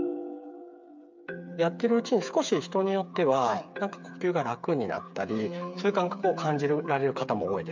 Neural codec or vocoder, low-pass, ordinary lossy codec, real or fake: codec, 16 kHz, 8 kbps, FreqCodec, smaller model; 7.2 kHz; MP3, 64 kbps; fake